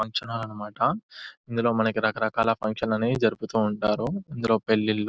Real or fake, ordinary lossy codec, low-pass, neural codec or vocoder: real; none; none; none